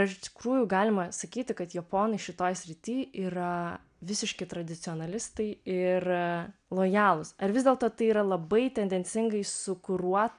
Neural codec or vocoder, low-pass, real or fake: none; 9.9 kHz; real